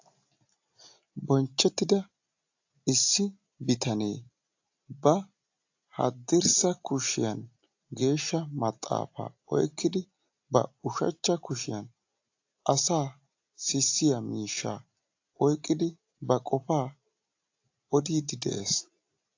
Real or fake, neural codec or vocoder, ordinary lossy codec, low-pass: real; none; AAC, 48 kbps; 7.2 kHz